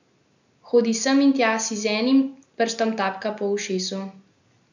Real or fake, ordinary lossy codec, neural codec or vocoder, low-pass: real; none; none; 7.2 kHz